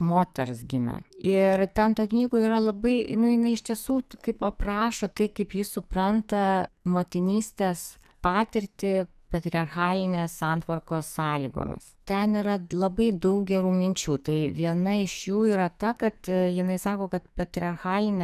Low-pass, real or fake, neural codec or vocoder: 14.4 kHz; fake; codec, 44.1 kHz, 2.6 kbps, SNAC